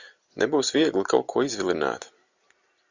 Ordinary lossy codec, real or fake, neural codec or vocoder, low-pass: Opus, 64 kbps; real; none; 7.2 kHz